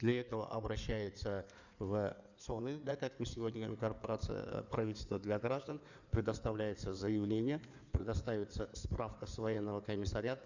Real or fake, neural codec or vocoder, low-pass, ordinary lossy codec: fake; codec, 16 kHz in and 24 kHz out, 2.2 kbps, FireRedTTS-2 codec; 7.2 kHz; none